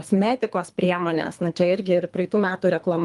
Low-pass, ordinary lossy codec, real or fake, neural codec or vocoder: 10.8 kHz; Opus, 32 kbps; fake; codec, 24 kHz, 3 kbps, HILCodec